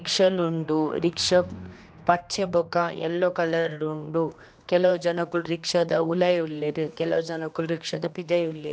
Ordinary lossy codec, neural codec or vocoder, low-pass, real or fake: none; codec, 16 kHz, 2 kbps, X-Codec, HuBERT features, trained on general audio; none; fake